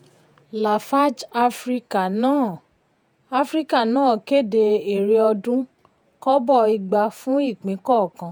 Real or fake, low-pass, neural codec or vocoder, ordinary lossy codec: fake; none; vocoder, 48 kHz, 128 mel bands, Vocos; none